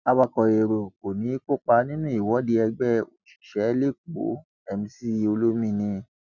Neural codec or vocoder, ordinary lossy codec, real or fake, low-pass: none; none; real; 7.2 kHz